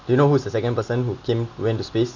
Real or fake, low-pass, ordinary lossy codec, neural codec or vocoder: real; 7.2 kHz; Opus, 64 kbps; none